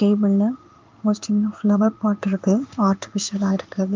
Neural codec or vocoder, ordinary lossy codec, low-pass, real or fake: codec, 16 kHz in and 24 kHz out, 1 kbps, XY-Tokenizer; Opus, 24 kbps; 7.2 kHz; fake